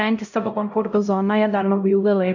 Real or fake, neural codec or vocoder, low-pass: fake; codec, 16 kHz, 0.5 kbps, X-Codec, HuBERT features, trained on LibriSpeech; 7.2 kHz